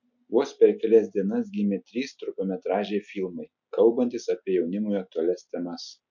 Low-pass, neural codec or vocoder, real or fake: 7.2 kHz; none; real